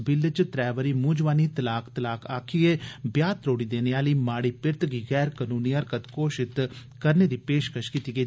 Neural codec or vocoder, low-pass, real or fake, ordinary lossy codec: none; none; real; none